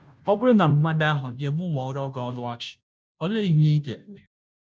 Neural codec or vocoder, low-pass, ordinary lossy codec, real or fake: codec, 16 kHz, 0.5 kbps, FunCodec, trained on Chinese and English, 25 frames a second; none; none; fake